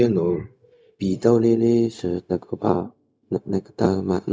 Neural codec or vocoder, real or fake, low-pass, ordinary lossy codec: codec, 16 kHz, 0.4 kbps, LongCat-Audio-Codec; fake; none; none